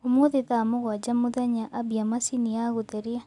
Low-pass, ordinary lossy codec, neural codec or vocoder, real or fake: 10.8 kHz; none; none; real